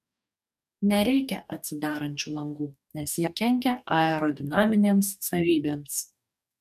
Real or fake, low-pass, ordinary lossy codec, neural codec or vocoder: fake; 14.4 kHz; MP3, 96 kbps; codec, 44.1 kHz, 2.6 kbps, DAC